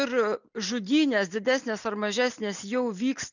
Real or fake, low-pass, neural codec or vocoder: real; 7.2 kHz; none